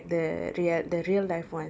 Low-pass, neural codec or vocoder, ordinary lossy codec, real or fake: none; none; none; real